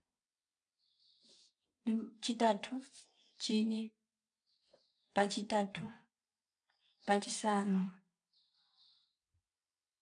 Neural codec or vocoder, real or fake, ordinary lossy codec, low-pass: vocoder, 22.05 kHz, 80 mel bands, WaveNeXt; fake; none; 9.9 kHz